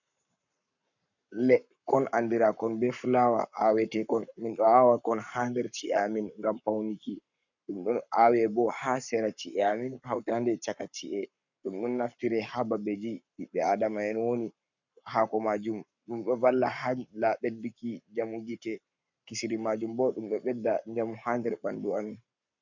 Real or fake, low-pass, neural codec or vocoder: fake; 7.2 kHz; codec, 44.1 kHz, 7.8 kbps, Pupu-Codec